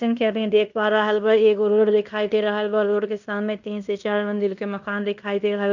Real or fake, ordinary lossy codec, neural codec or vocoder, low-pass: fake; none; codec, 16 kHz in and 24 kHz out, 0.9 kbps, LongCat-Audio-Codec, fine tuned four codebook decoder; 7.2 kHz